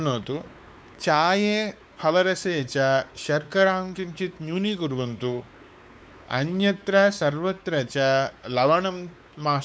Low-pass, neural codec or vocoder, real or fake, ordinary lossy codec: none; codec, 16 kHz, 4 kbps, X-Codec, WavLM features, trained on Multilingual LibriSpeech; fake; none